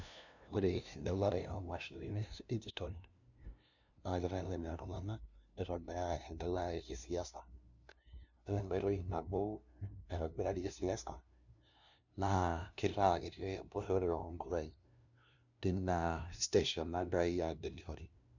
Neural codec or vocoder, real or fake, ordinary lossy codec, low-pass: codec, 16 kHz, 0.5 kbps, FunCodec, trained on LibriTTS, 25 frames a second; fake; none; 7.2 kHz